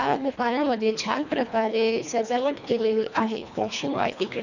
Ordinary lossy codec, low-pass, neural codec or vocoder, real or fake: none; 7.2 kHz; codec, 24 kHz, 1.5 kbps, HILCodec; fake